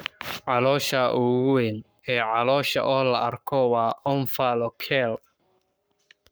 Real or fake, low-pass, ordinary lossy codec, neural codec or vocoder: fake; none; none; codec, 44.1 kHz, 7.8 kbps, Pupu-Codec